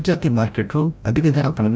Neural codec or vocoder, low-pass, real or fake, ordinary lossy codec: codec, 16 kHz, 0.5 kbps, FreqCodec, larger model; none; fake; none